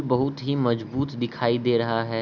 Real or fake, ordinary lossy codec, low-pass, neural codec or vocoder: real; none; 7.2 kHz; none